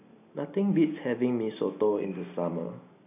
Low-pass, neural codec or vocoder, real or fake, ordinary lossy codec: 3.6 kHz; none; real; none